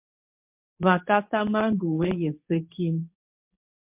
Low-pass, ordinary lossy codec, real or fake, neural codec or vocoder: 3.6 kHz; MP3, 32 kbps; fake; codec, 24 kHz, 0.9 kbps, WavTokenizer, medium speech release version 2